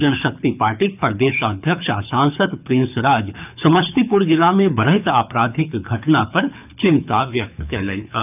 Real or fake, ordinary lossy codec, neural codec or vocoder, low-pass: fake; none; codec, 24 kHz, 6 kbps, HILCodec; 3.6 kHz